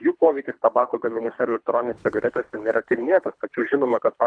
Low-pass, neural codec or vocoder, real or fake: 9.9 kHz; codec, 24 kHz, 3 kbps, HILCodec; fake